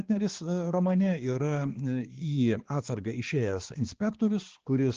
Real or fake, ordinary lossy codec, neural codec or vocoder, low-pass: fake; Opus, 16 kbps; codec, 16 kHz, 2 kbps, X-Codec, HuBERT features, trained on balanced general audio; 7.2 kHz